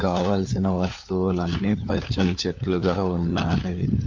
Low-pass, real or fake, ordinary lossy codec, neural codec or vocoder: 7.2 kHz; fake; MP3, 64 kbps; codec, 16 kHz, 4 kbps, FunCodec, trained on LibriTTS, 50 frames a second